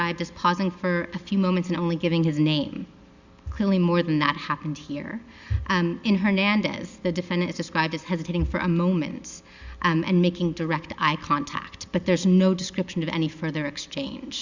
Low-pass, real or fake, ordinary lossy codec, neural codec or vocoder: 7.2 kHz; real; Opus, 64 kbps; none